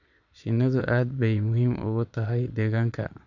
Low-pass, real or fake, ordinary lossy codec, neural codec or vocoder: 7.2 kHz; fake; none; vocoder, 22.05 kHz, 80 mel bands, Vocos